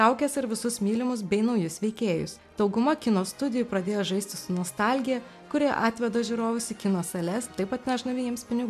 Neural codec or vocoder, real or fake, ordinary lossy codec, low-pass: none; real; MP3, 96 kbps; 14.4 kHz